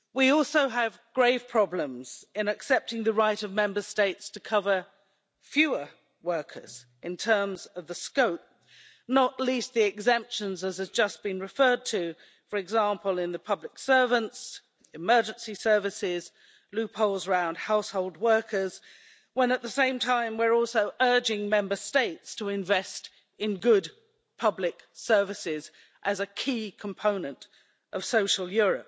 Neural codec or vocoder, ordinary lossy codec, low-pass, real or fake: none; none; none; real